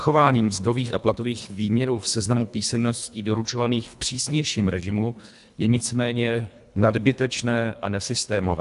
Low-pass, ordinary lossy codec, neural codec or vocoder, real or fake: 10.8 kHz; AAC, 96 kbps; codec, 24 kHz, 1.5 kbps, HILCodec; fake